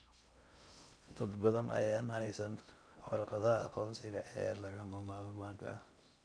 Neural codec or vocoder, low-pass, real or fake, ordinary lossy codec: codec, 16 kHz in and 24 kHz out, 0.6 kbps, FocalCodec, streaming, 2048 codes; 9.9 kHz; fake; none